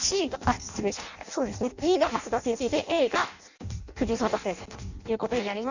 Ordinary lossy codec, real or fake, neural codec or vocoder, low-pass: none; fake; codec, 16 kHz in and 24 kHz out, 0.6 kbps, FireRedTTS-2 codec; 7.2 kHz